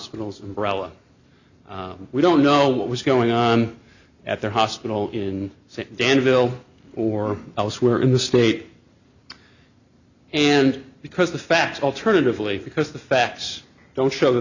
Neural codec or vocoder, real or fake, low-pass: none; real; 7.2 kHz